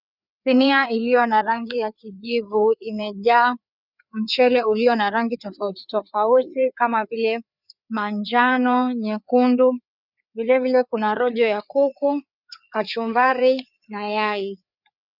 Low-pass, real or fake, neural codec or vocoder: 5.4 kHz; fake; codec, 16 kHz, 4 kbps, FreqCodec, larger model